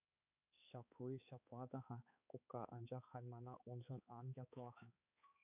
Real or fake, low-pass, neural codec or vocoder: fake; 3.6 kHz; codec, 16 kHz, 4 kbps, X-Codec, HuBERT features, trained on balanced general audio